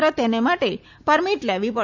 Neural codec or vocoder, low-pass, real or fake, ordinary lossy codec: none; 7.2 kHz; real; none